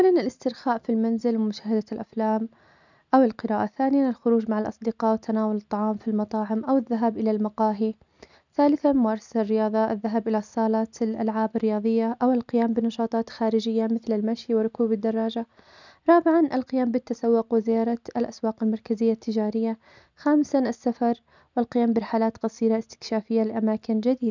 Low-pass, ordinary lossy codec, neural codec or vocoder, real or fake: 7.2 kHz; none; none; real